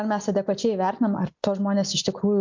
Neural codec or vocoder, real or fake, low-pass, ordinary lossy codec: none; real; 7.2 kHz; MP3, 64 kbps